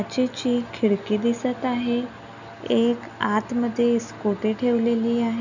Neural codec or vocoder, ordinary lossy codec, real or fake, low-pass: none; none; real; 7.2 kHz